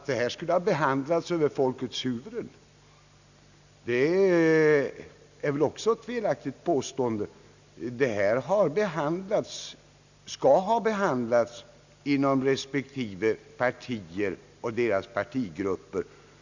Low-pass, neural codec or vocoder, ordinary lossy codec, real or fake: 7.2 kHz; none; none; real